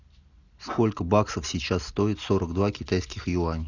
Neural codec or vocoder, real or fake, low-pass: none; real; 7.2 kHz